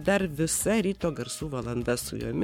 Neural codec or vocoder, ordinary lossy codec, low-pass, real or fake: codec, 44.1 kHz, 7.8 kbps, Pupu-Codec; MP3, 96 kbps; 19.8 kHz; fake